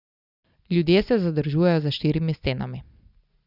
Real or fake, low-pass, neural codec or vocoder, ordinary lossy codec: real; 5.4 kHz; none; Opus, 64 kbps